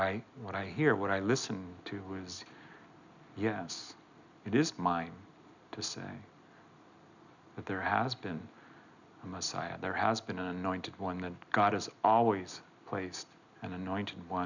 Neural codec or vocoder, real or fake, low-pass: none; real; 7.2 kHz